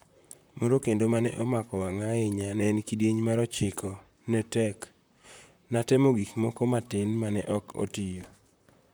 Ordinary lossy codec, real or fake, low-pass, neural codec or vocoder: none; fake; none; vocoder, 44.1 kHz, 128 mel bands, Pupu-Vocoder